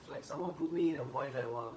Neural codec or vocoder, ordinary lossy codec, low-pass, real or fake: codec, 16 kHz, 16 kbps, FunCodec, trained on LibriTTS, 50 frames a second; none; none; fake